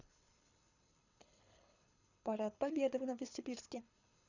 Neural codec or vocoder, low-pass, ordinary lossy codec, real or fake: codec, 24 kHz, 6 kbps, HILCodec; 7.2 kHz; none; fake